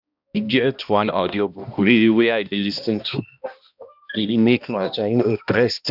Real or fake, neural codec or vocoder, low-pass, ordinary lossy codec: fake; codec, 16 kHz, 1 kbps, X-Codec, HuBERT features, trained on balanced general audio; 5.4 kHz; none